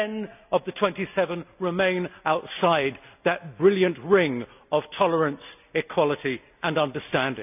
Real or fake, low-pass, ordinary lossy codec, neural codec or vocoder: real; 3.6 kHz; none; none